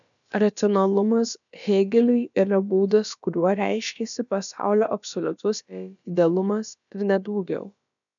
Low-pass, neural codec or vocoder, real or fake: 7.2 kHz; codec, 16 kHz, about 1 kbps, DyCAST, with the encoder's durations; fake